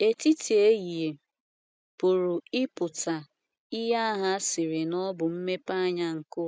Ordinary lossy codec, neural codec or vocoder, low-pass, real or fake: none; none; none; real